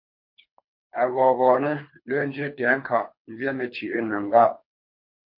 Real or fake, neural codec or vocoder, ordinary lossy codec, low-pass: fake; codec, 24 kHz, 3 kbps, HILCodec; MP3, 32 kbps; 5.4 kHz